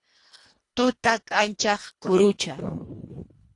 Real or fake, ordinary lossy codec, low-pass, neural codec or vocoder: fake; AAC, 48 kbps; 10.8 kHz; codec, 24 kHz, 1.5 kbps, HILCodec